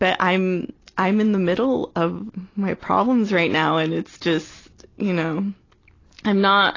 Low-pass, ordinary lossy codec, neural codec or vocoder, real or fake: 7.2 kHz; AAC, 32 kbps; none; real